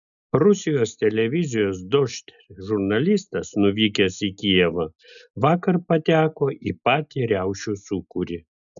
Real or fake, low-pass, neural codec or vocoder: real; 7.2 kHz; none